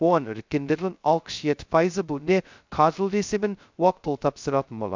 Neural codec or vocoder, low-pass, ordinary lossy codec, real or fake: codec, 16 kHz, 0.2 kbps, FocalCodec; 7.2 kHz; MP3, 64 kbps; fake